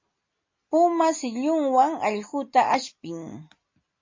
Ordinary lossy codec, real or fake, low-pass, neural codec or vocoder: MP3, 32 kbps; real; 7.2 kHz; none